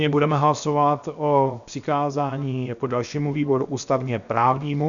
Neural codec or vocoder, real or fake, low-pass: codec, 16 kHz, 0.7 kbps, FocalCodec; fake; 7.2 kHz